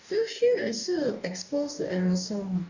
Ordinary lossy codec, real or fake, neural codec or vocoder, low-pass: none; fake; codec, 44.1 kHz, 2.6 kbps, DAC; 7.2 kHz